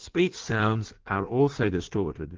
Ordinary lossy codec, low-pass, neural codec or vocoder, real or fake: Opus, 16 kbps; 7.2 kHz; codec, 16 kHz in and 24 kHz out, 1.1 kbps, FireRedTTS-2 codec; fake